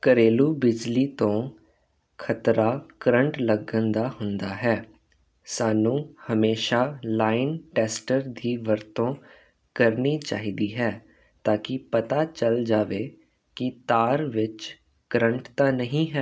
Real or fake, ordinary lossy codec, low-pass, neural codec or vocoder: real; none; none; none